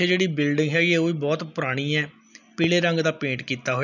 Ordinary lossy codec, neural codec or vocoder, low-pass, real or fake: none; none; 7.2 kHz; real